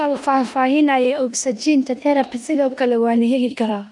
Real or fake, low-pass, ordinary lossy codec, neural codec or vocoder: fake; 10.8 kHz; none; codec, 16 kHz in and 24 kHz out, 0.9 kbps, LongCat-Audio-Codec, four codebook decoder